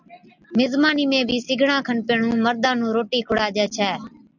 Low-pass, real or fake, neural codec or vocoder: 7.2 kHz; real; none